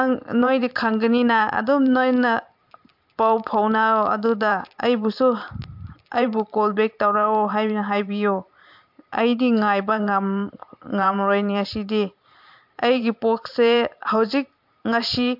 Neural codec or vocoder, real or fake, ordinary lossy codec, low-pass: vocoder, 44.1 kHz, 128 mel bands every 512 samples, BigVGAN v2; fake; MP3, 48 kbps; 5.4 kHz